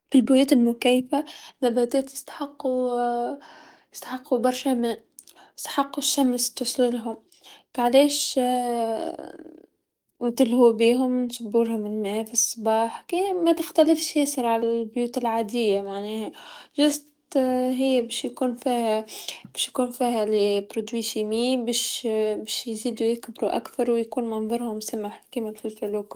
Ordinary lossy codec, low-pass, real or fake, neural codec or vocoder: Opus, 32 kbps; 19.8 kHz; fake; codec, 44.1 kHz, 7.8 kbps, DAC